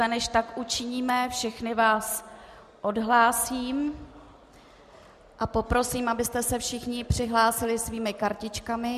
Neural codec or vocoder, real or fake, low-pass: none; real; 14.4 kHz